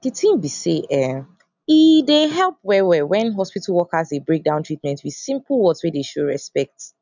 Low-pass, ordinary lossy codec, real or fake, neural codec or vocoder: 7.2 kHz; none; real; none